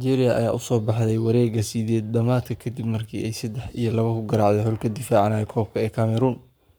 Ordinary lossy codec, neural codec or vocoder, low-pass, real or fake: none; codec, 44.1 kHz, 7.8 kbps, Pupu-Codec; none; fake